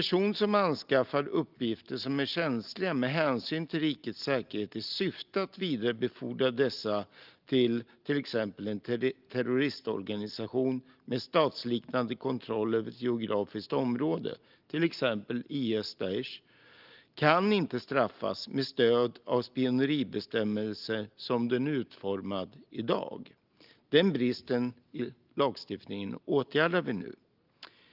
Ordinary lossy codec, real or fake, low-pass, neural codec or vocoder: Opus, 32 kbps; real; 5.4 kHz; none